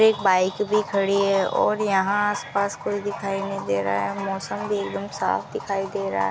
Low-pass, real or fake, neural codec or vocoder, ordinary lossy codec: none; real; none; none